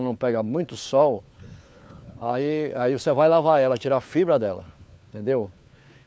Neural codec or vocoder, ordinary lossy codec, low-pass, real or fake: codec, 16 kHz, 4 kbps, FunCodec, trained on LibriTTS, 50 frames a second; none; none; fake